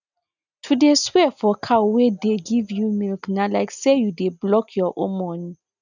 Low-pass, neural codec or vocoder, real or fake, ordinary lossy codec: 7.2 kHz; none; real; none